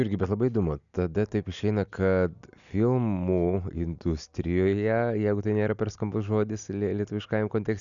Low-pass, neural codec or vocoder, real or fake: 7.2 kHz; none; real